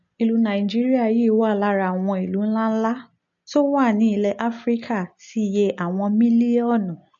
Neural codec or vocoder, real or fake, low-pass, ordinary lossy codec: none; real; 7.2 kHz; MP3, 48 kbps